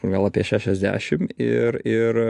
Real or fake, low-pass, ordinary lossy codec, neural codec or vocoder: real; 10.8 kHz; AAC, 64 kbps; none